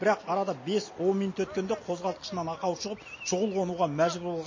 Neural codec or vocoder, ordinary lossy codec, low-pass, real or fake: none; MP3, 32 kbps; 7.2 kHz; real